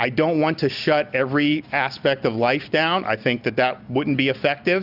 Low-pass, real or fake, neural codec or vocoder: 5.4 kHz; real; none